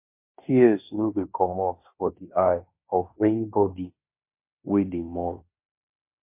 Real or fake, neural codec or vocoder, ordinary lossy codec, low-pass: fake; codec, 16 kHz in and 24 kHz out, 0.9 kbps, LongCat-Audio-Codec, fine tuned four codebook decoder; MP3, 24 kbps; 3.6 kHz